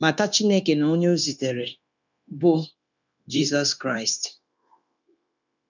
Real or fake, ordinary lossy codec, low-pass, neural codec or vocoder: fake; none; 7.2 kHz; codec, 16 kHz, 0.9 kbps, LongCat-Audio-Codec